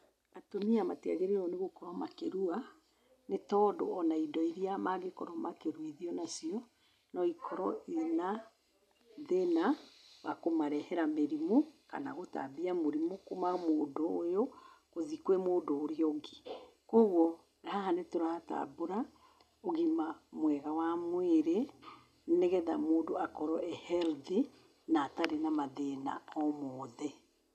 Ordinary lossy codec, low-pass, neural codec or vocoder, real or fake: none; 14.4 kHz; none; real